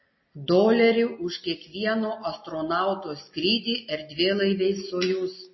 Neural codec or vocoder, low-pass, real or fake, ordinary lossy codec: none; 7.2 kHz; real; MP3, 24 kbps